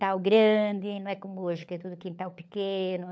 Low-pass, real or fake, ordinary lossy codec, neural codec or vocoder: none; fake; none; codec, 16 kHz, 16 kbps, FunCodec, trained on LibriTTS, 50 frames a second